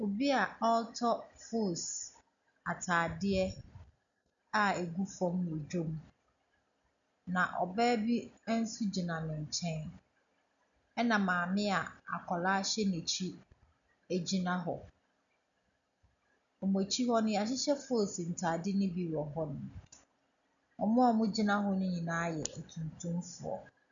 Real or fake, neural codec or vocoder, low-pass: real; none; 7.2 kHz